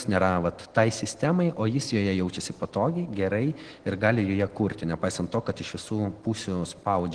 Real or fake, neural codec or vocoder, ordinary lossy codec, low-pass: real; none; Opus, 16 kbps; 9.9 kHz